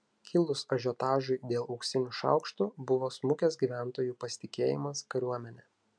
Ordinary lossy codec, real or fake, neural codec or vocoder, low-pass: MP3, 96 kbps; real; none; 9.9 kHz